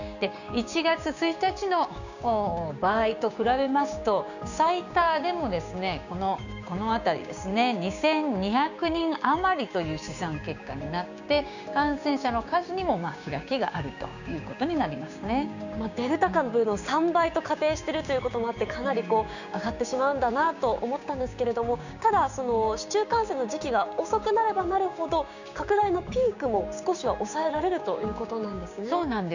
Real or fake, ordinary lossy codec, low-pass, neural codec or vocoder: fake; none; 7.2 kHz; codec, 16 kHz, 6 kbps, DAC